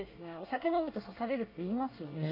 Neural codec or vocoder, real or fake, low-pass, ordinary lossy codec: codec, 24 kHz, 1 kbps, SNAC; fake; 5.4 kHz; none